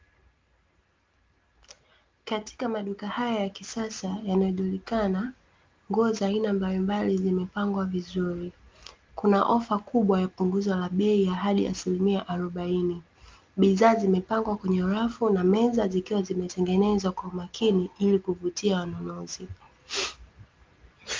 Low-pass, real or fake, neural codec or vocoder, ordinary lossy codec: 7.2 kHz; real; none; Opus, 32 kbps